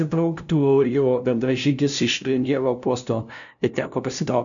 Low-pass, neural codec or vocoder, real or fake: 7.2 kHz; codec, 16 kHz, 0.5 kbps, FunCodec, trained on LibriTTS, 25 frames a second; fake